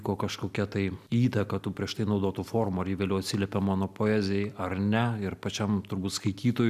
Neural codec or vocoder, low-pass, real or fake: none; 14.4 kHz; real